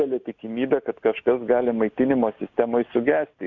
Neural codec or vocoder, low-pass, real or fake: none; 7.2 kHz; real